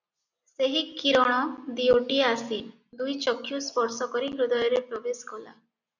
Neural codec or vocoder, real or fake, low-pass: none; real; 7.2 kHz